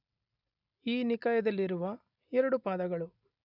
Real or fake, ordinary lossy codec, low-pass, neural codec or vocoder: real; none; 5.4 kHz; none